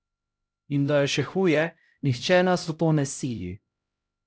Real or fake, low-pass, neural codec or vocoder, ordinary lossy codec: fake; none; codec, 16 kHz, 0.5 kbps, X-Codec, HuBERT features, trained on LibriSpeech; none